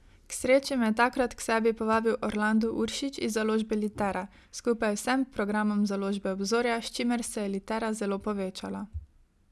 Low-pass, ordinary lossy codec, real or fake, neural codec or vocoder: none; none; real; none